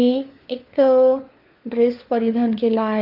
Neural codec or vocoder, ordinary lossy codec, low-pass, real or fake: codec, 16 kHz, 4 kbps, FunCodec, trained on LibriTTS, 50 frames a second; Opus, 32 kbps; 5.4 kHz; fake